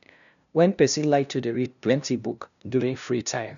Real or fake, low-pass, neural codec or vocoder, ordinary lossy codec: fake; 7.2 kHz; codec, 16 kHz, 0.5 kbps, FunCodec, trained on LibriTTS, 25 frames a second; none